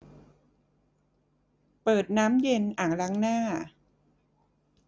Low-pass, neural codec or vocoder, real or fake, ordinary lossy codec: none; none; real; none